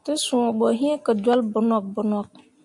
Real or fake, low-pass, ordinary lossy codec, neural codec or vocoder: real; 10.8 kHz; MP3, 64 kbps; none